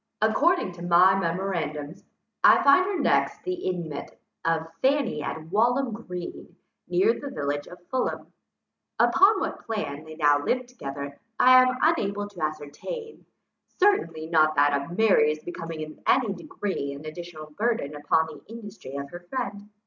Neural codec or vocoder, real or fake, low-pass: none; real; 7.2 kHz